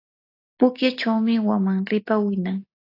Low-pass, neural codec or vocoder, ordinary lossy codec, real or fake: 5.4 kHz; none; AAC, 32 kbps; real